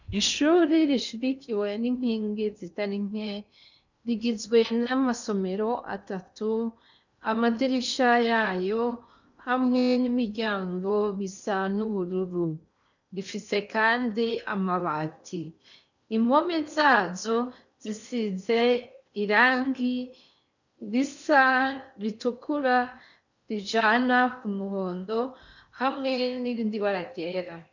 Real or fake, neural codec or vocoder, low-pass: fake; codec, 16 kHz in and 24 kHz out, 0.8 kbps, FocalCodec, streaming, 65536 codes; 7.2 kHz